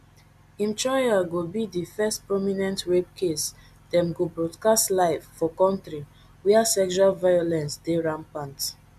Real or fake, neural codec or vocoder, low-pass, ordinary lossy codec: real; none; 14.4 kHz; MP3, 96 kbps